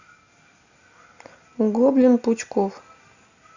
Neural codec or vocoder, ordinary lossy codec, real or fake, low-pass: none; Opus, 64 kbps; real; 7.2 kHz